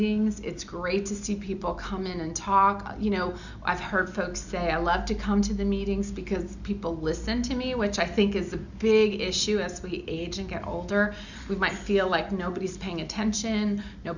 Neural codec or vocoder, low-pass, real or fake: none; 7.2 kHz; real